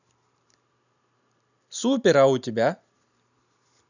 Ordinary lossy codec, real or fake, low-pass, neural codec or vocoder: none; fake; 7.2 kHz; vocoder, 44.1 kHz, 128 mel bands every 512 samples, BigVGAN v2